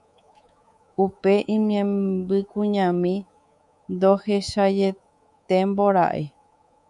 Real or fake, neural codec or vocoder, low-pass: fake; codec, 24 kHz, 3.1 kbps, DualCodec; 10.8 kHz